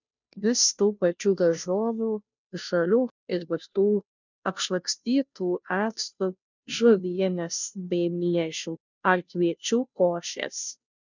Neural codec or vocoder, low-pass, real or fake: codec, 16 kHz, 0.5 kbps, FunCodec, trained on Chinese and English, 25 frames a second; 7.2 kHz; fake